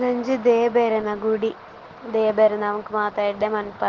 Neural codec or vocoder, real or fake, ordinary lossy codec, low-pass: none; real; Opus, 16 kbps; 7.2 kHz